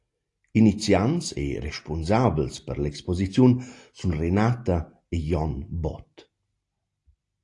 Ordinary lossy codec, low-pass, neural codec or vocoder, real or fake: AAC, 48 kbps; 10.8 kHz; none; real